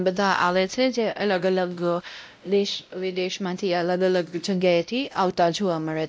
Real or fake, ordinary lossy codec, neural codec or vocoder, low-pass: fake; none; codec, 16 kHz, 0.5 kbps, X-Codec, WavLM features, trained on Multilingual LibriSpeech; none